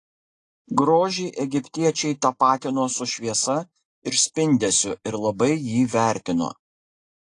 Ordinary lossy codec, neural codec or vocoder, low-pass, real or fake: AAC, 48 kbps; none; 10.8 kHz; real